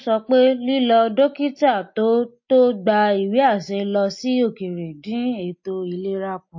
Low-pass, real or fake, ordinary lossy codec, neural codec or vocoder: 7.2 kHz; real; MP3, 32 kbps; none